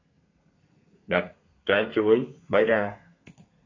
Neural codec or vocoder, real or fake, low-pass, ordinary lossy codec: codec, 32 kHz, 1.9 kbps, SNAC; fake; 7.2 kHz; AAC, 32 kbps